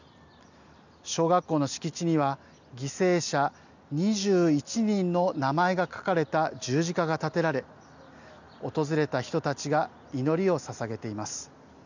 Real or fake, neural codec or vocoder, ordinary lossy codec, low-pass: real; none; none; 7.2 kHz